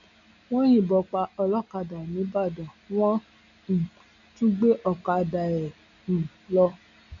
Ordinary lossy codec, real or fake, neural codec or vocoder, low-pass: none; real; none; 7.2 kHz